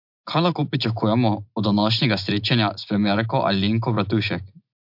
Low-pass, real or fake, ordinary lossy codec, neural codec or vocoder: 5.4 kHz; real; MP3, 48 kbps; none